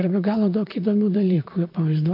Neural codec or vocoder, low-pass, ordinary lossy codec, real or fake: vocoder, 44.1 kHz, 128 mel bands, Pupu-Vocoder; 5.4 kHz; AAC, 32 kbps; fake